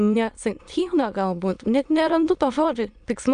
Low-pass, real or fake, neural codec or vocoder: 9.9 kHz; fake; autoencoder, 22.05 kHz, a latent of 192 numbers a frame, VITS, trained on many speakers